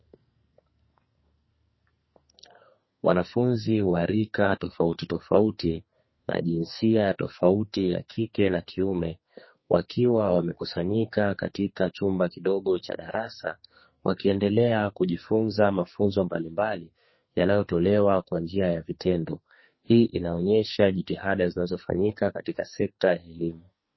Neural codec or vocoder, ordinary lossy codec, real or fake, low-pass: codec, 44.1 kHz, 2.6 kbps, SNAC; MP3, 24 kbps; fake; 7.2 kHz